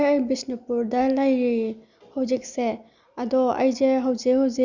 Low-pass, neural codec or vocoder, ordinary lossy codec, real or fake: 7.2 kHz; none; Opus, 64 kbps; real